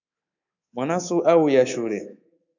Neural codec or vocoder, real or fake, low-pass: codec, 24 kHz, 3.1 kbps, DualCodec; fake; 7.2 kHz